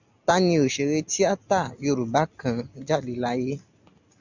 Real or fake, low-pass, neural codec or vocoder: real; 7.2 kHz; none